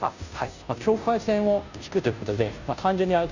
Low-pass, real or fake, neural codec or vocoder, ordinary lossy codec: 7.2 kHz; fake; codec, 16 kHz, 0.5 kbps, FunCodec, trained on Chinese and English, 25 frames a second; none